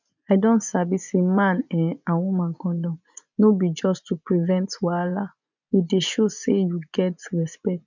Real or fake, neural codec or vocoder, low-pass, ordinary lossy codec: fake; vocoder, 24 kHz, 100 mel bands, Vocos; 7.2 kHz; none